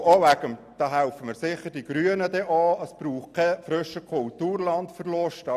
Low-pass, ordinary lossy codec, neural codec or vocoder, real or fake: 14.4 kHz; none; none; real